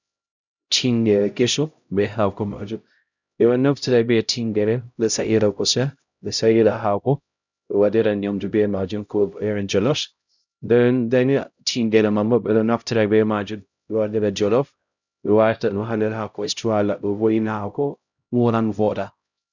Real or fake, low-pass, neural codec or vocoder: fake; 7.2 kHz; codec, 16 kHz, 0.5 kbps, X-Codec, HuBERT features, trained on LibriSpeech